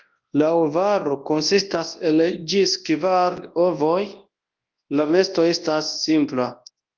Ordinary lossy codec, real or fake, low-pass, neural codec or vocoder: Opus, 32 kbps; fake; 7.2 kHz; codec, 24 kHz, 0.9 kbps, WavTokenizer, large speech release